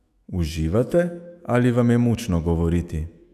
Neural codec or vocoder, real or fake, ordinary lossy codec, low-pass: autoencoder, 48 kHz, 128 numbers a frame, DAC-VAE, trained on Japanese speech; fake; none; 14.4 kHz